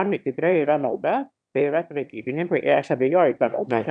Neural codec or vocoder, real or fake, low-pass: autoencoder, 22.05 kHz, a latent of 192 numbers a frame, VITS, trained on one speaker; fake; 9.9 kHz